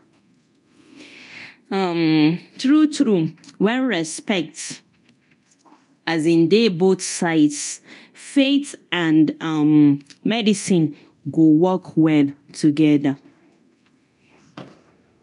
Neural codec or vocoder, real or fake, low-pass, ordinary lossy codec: codec, 24 kHz, 0.9 kbps, DualCodec; fake; 10.8 kHz; none